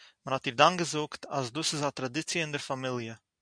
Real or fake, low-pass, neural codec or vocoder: real; 9.9 kHz; none